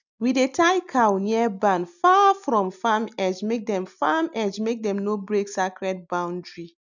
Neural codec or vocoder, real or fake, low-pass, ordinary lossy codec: none; real; 7.2 kHz; none